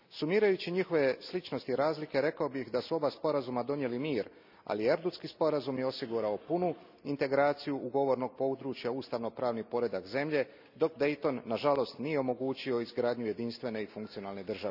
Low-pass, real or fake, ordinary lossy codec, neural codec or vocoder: 5.4 kHz; real; none; none